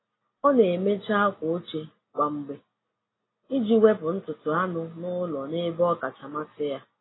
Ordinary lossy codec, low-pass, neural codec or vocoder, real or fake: AAC, 16 kbps; 7.2 kHz; none; real